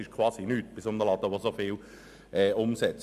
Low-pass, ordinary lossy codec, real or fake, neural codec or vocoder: 14.4 kHz; none; real; none